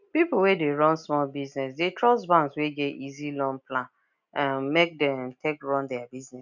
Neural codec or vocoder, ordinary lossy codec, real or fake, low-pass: none; none; real; 7.2 kHz